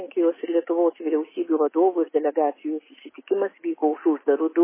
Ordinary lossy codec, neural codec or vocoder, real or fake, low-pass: MP3, 16 kbps; none; real; 3.6 kHz